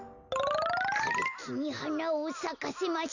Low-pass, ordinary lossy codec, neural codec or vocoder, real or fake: 7.2 kHz; none; none; real